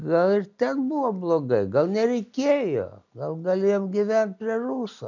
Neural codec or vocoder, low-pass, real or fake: none; 7.2 kHz; real